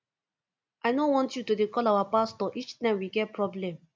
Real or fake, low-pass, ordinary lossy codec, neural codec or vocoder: real; none; none; none